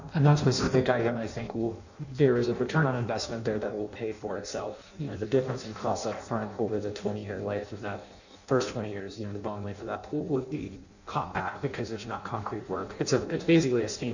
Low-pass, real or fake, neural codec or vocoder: 7.2 kHz; fake; codec, 16 kHz in and 24 kHz out, 0.6 kbps, FireRedTTS-2 codec